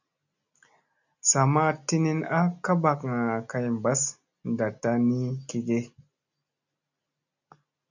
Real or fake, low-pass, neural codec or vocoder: real; 7.2 kHz; none